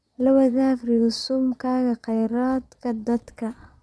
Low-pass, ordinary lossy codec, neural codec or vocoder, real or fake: none; none; vocoder, 22.05 kHz, 80 mel bands, Vocos; fake